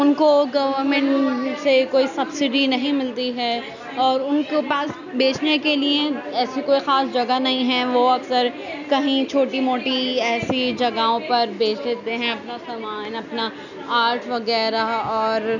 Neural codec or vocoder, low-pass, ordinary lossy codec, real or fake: none; 7.2 kHz; none; real